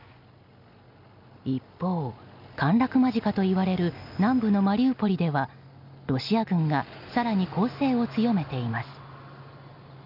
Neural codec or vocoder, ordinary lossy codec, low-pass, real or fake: none; none; 5.4 kHz; real